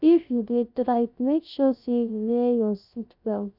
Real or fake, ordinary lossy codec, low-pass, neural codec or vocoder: fake; none; 5.4 kHz; codec, 16 kHz, 0.3 kbps, FocalCodec